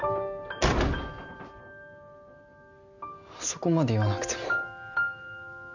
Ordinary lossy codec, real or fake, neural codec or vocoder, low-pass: none; real; none; 7.2 kHz